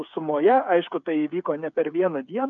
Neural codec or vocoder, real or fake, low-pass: codec, 16 kHz, 8 kbps, FreqCodec, smaller model; fake; 7.2 kHz